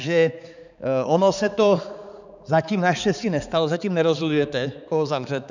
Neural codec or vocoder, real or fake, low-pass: codec, 16 kHz, 4 kbps, X-Codec, HuBERT features, trained on balanced general audio; fake; 7.2 kHz